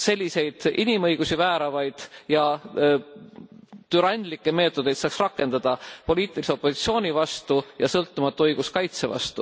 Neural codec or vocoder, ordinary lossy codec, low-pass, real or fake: none; none; none; real